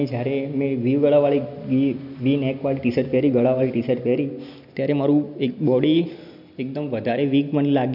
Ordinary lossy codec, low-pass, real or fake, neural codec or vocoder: none; 5.4 kHz; real; none